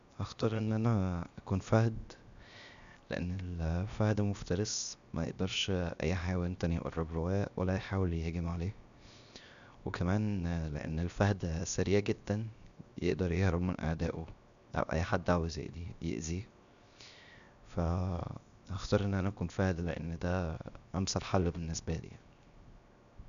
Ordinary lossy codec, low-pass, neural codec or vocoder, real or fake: none; 7.2 kHz; codec, 16 kHz, 0.7 kbps, FocalCodec; fake